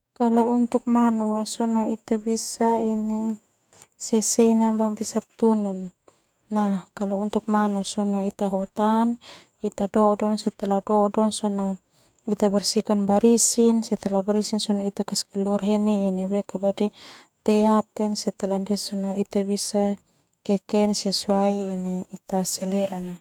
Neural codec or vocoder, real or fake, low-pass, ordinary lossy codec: codec, 44.1 kHz, 2.6 kbps, DAC; fake; 19.8 kHz; none